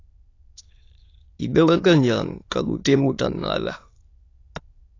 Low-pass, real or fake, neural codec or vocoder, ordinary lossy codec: 7.2 kHz; fake; autoencoder, 22.05 kHz, a latent of 192 numbers a frame, VITS, trained on many speakers; AAC, 32 kbps